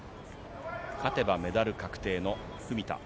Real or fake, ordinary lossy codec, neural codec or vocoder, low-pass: real; none; none; none